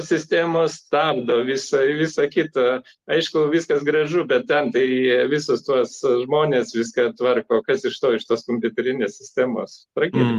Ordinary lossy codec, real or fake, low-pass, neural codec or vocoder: Opus, 24 kbps; real; 14.4 kHz; none